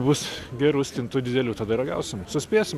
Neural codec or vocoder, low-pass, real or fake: none; 14.4 kHz; real